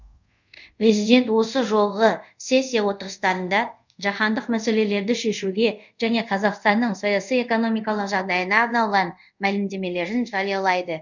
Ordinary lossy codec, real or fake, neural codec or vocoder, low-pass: none; fake; codec, 24 kHz, 0.5 kbps, DualCodec; 7.2 kHz